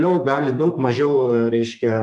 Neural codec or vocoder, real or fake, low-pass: codec, 32 kHz, 1.9 kbps, SNAC; fake; 10.8 kHz